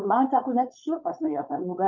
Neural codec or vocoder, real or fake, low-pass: codec, 16 kHz, 4.8 kbps, FACodec; fake; 7.2 kHz